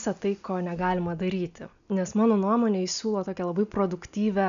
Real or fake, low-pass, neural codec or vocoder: real; 7.2 kHz; none